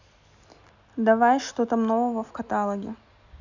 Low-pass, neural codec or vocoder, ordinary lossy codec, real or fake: 7.2 kHz; none; none; real